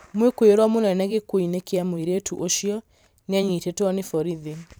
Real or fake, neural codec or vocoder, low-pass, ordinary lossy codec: fake; vocoder, 44.1 kHz, 128 mel bands every 512 samples, BigVGAN v2; none; none